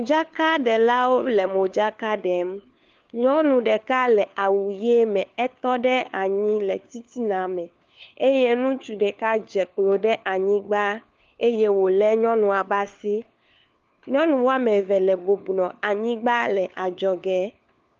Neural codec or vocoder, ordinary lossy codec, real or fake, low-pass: codec, 16 kHz, 4 kbps, FunCodec, trained on LibriTTS, 50 frames a second; Opus, 32 kbps; fake; 7.2 kHz